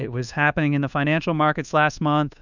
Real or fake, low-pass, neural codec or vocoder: fake; 7.2 kHz; codec, 24 kHz, 1.2 kbps, DualCodec